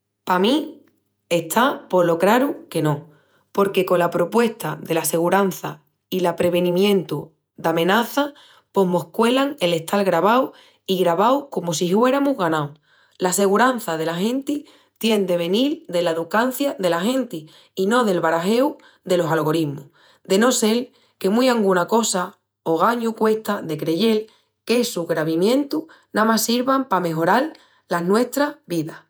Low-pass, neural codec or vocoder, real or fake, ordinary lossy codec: none; vocoder, 48 kHz, 128 mel bands, Vocos; fake; none